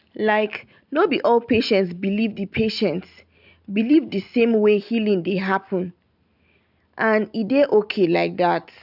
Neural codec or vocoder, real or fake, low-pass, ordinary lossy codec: none; real; 5.4 kHz; none